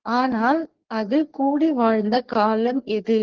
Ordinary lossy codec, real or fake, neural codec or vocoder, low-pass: Opus, 16 kbps; fake; codec, 44.1 kHz, 3.4 kbps, Pupu-Codec; 7.2 kHz